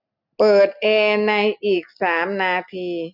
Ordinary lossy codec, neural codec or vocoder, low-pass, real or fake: none; none; 5.4 kHz; real